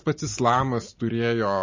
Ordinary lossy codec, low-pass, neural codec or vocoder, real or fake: MP3, 32 kbps; 7.2 kHz; none; real